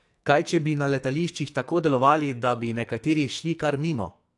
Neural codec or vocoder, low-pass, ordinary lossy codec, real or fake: codec, 44.1 kHz, 2.6 kbps, SNAC; 10.8 kHz; AAC, 64 kbps; fake